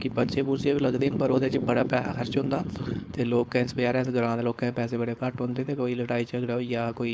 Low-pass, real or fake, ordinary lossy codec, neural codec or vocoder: none; fake; none; codec, 16 kHz, 4.8 kbps, FACodec